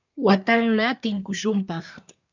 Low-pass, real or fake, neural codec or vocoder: 7.2 kHz; fake; codec, 24 kHz, 1 kbps, SNAC